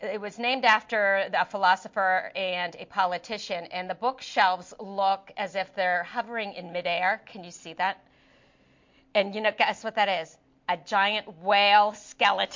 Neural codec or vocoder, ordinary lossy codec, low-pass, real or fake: none; MP3, 48 kbps; 7.2 kHz; real